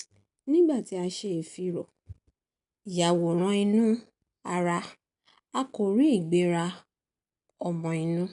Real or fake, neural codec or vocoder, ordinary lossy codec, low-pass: real; none; none; 10.8 kHz